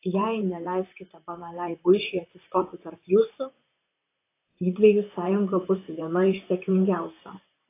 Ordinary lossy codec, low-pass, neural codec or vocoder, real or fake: AAC, 24 kbps; 3.6 kHz; vocoder, 44.1 kHz, 128 mel bands, Pupu-Vocoder; fake